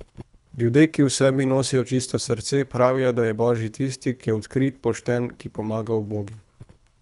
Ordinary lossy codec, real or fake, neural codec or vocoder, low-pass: none; fake; codec, 24 kHz, 3 kbps, HILCodec; 10.8 kHz